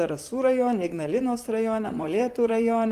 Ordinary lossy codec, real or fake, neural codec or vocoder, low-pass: Opus, 24 kbps; fake; vocoder, 44.1 kHz, 128 mel bands, Pupu-Vocoder; 14.4 kHz